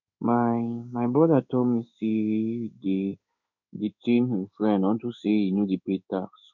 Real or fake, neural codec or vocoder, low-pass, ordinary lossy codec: fake; codec, 16 kHz in and 24 kHz out, 1 kbps, XY-Tokenizer; 7.2 kHz; none